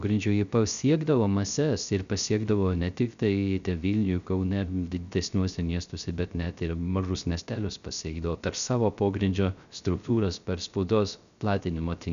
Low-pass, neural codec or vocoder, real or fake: 7.2 kHz; codec, 16 kHz, 0.3 kbps, FocalCodec; fake